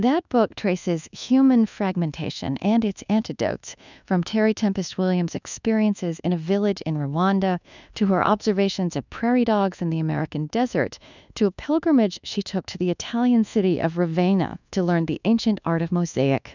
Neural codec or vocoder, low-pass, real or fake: codec, 24 kHz, 1.2 kbps, DualCodec; 7.2 kHz; fake